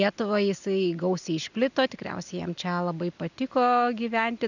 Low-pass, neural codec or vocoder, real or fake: 7.2 kHz; none; real